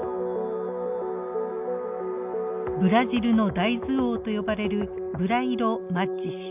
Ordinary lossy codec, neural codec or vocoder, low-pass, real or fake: none; none; 3.6 kHz; real